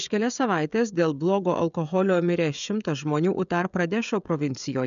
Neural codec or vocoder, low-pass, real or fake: codec, 16 kHz, 16 kbps, FreqCodec, smaller model; 7.2 kHz; fake